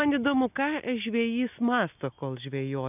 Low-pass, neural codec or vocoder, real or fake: 3.6 kHz; none; real